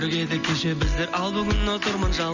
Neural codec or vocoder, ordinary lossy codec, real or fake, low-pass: none; none; real; 7.2 kHz